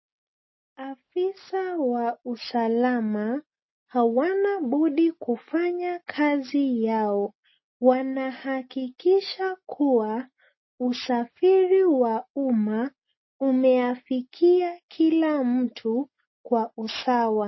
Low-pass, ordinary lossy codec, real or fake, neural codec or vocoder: 7.2 kHz; MP3, 24 kbps; real; none